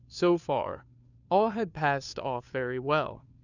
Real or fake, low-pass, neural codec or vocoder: fake; 7.2 kHz; codec, 16 kHz, 2 kbps, FunCodec, trained on Chinese and English, 25 frames a second